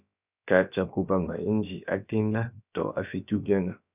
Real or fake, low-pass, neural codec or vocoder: fake; 3.6 kHz; codec, 16 kHz, about 1 kbps, DyCAST, with the encoder's durations